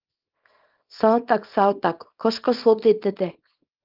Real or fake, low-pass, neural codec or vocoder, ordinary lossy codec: fake; 5.4 kHz; codec, 24 kHz, 0.9 kbps, WavTokenizer, small release; Opus, 32 kbps